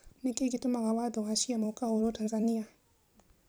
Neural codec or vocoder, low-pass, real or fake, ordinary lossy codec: vocoder, 44.1 kHz, 128 mel bands every 256 samples, BigVGAN v2; none; fake; none